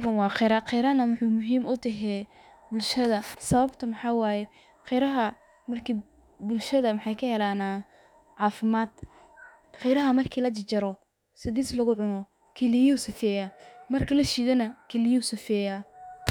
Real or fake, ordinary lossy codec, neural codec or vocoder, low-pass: fake; none; autoencoder, 48 kHz, 32 numbers a frame, DAC-VAE, trained on Japanese speech; 19.8 kHz